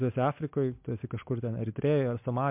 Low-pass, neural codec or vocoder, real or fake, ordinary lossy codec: 3.6 kHz; none; real; MP3, 32 kbps